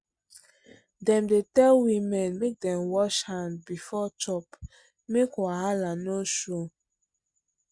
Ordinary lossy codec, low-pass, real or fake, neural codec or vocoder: Opus, 64 kbps; 9.9 kHz; real; none